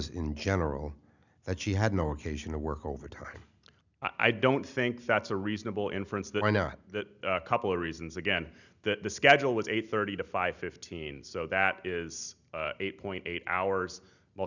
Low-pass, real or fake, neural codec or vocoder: 7.2 kHz; real; none